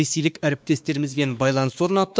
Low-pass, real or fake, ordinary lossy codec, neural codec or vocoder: none; fake; none; codec, 16 kHz, 2 kbps, X-Codec, WavLM features, trained on Multilingual LibriSpeech